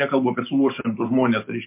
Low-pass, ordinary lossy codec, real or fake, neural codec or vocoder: 3.6 kHz; MP3, 24 kbps; real; none